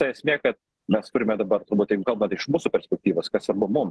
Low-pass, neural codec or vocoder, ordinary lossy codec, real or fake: 10.8 kHz; none; Opus, 16 kbps; real